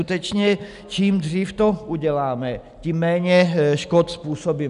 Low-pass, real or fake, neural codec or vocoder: 10.8 kHz; real; none